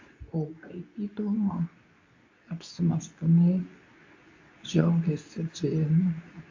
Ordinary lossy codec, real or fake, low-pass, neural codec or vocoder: AAC, 48 kbps; fake; 7.2 kHz; codec, 24 kHz, 0.9 kbps, WavTokenizer, medium speech release version 2